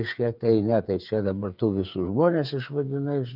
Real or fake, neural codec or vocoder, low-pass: fake; codec, 16 kHz, 8 kbps, FreqCodec, smaller model; 5.4 kHz